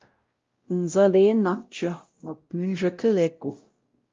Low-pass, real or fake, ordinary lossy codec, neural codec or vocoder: 7.2 kHz; fake; Opus, 32 kbps; codec, 16 kHz, 0.5 kbps, X-Codec, WavLM features, trained on Multilingual LibriSpeech